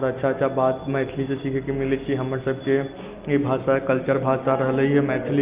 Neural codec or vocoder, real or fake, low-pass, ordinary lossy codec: none; real; 3.6 kHz; Opus, 24 kbps